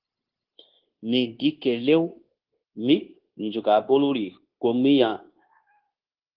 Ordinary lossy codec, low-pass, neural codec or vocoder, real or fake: Opus, 16 kbps; 5.4 kHz; codec, 16 kHz, 0.9 kbps, LongCat-Audio-Codec; fake